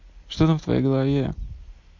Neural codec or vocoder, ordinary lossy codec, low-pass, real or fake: none; MP3, 48 kbps; 7.2 kHz; real